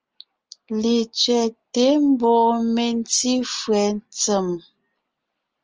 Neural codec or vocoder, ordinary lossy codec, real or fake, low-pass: none; Opus, 24 kbps; real; 7.2 kHz